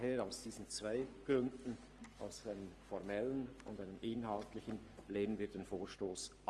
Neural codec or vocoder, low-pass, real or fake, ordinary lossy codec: codec, 44.1 kHz, 7.8 kbps, Pupu-Codec; 10.8 kHz; fake; Opus, 24 kbps